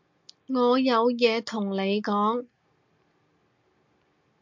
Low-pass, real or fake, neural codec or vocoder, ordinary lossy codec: 7.2 kHz; real; none; MP3, 48 kbps